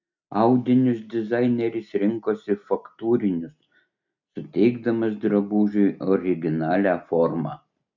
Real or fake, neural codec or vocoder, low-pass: real; none; 7.2 kHz